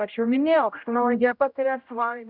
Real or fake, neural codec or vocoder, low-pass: fake; codec, 16 kHz, 0.5 kbps, X-Codec, HuBERT features, trained on general audio; 5.4 kHz